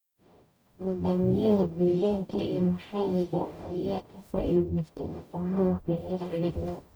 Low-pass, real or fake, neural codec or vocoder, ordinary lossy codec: none; fake; codec, 44.1 kHz, 0.9 kbps, DAC; none